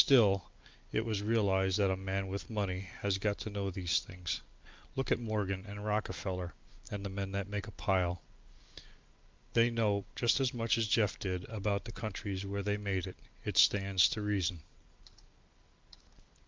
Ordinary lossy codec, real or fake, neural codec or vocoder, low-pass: Opus, 24 kbps; real; none; 7.2 kHz